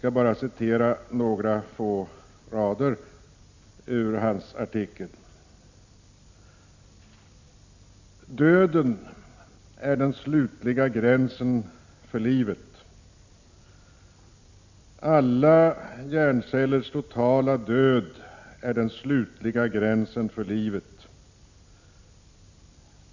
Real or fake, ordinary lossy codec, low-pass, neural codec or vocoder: real; Opus, 64 kbps; 7.2 kHz; none